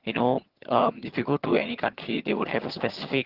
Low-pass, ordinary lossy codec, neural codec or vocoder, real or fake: 5.4 kHz; Opus, 16 kbps; vocoder, 22.05 kHz, 80 mel bands, HiFi-GAN; fake